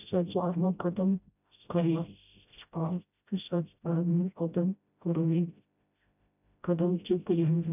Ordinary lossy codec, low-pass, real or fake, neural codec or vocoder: none; 3.6 kHz; fake; codec, 16 kHz, 0.5 kbps, FreqCodec, smaller model